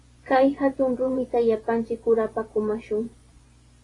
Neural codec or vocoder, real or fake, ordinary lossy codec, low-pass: vocoder, 24 kHz, 100 mel bands, Vocos; fake; AAC, 32 kbps; 10.8 kHz